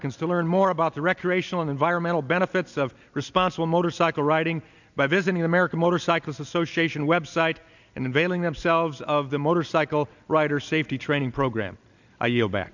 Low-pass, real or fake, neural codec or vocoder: 7.2 kHz; real; none